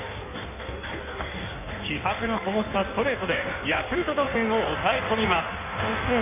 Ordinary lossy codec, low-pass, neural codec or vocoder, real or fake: none; 3.6 kHz; codec, 16 kHz in and 24 kHz out, 1.1 kbps, FireRedTTS-2 codec; fake